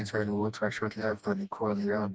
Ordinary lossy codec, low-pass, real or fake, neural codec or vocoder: none; none; fake; codec, 16 kHz, 1 kbps, FreqCodec, smaller model